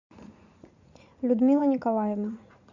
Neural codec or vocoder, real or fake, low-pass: vocoder, 44.1 kHz, 80 mel bands, Vocos; fake; 7.2 kHz